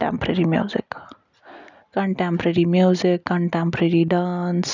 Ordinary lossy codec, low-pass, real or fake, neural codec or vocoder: none; 7.2 kHz; real; none